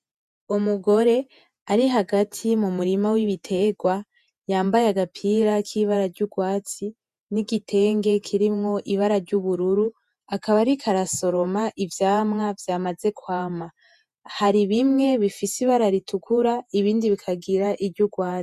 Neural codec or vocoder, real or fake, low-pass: vocoder, 48 kHz, 128 mel bands, Vocos; fake; 14.4 kHz